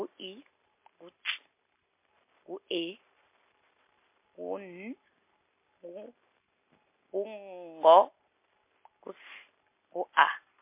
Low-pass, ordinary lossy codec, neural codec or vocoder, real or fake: 3.6 kHz; MP3, 24 kbps; none; real